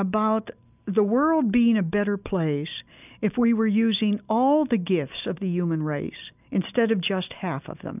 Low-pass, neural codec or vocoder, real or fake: 3.6 kHz; none; real